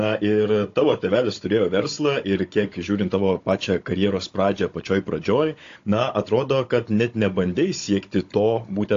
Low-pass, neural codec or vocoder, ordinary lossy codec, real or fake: 7.2 kHz; codec, 16 kHz, 16 kbps, FunCodec, trained on Chinese and English, 50 frames a second; AAC, 48 kbps; fake